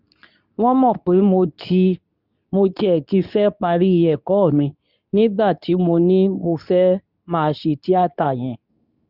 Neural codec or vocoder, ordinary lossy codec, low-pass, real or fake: codec, 24 kHz, 0.9 kbps, WavTokenizer, medium speech release version 2; none; 5.4 kHz; fake